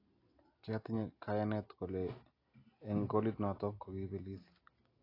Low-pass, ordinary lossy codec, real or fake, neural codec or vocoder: 5.4 kHz; AAC, 24 kbps; real; none